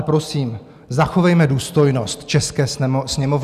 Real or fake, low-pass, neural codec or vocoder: real; 14.4 kHz; none